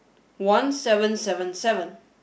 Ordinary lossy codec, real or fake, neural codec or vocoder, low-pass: none; real; none; none